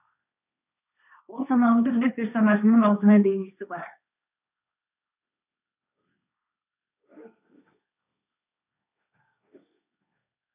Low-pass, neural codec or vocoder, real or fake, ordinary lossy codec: 3.6 kHz; codec, 16 kHz, 1.1 kbps, Voila-Tokenizer; fake; none